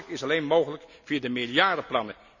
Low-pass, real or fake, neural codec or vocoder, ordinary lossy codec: 7.2 kHz; real; none; none